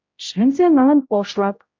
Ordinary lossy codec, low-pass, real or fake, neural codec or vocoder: MP3, 48 kbps; 7.2 kHz; fake; codec, 16 kHz, 0.5 kbps, X-Codec, HuBERT features, trained on general audio